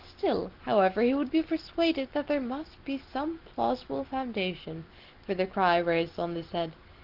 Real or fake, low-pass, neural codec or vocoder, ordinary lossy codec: real; 5.4 kHz; none; Opus, 16 kbps